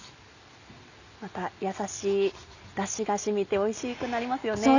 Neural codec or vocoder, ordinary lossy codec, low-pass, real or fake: none; none; 7.2 kHz; real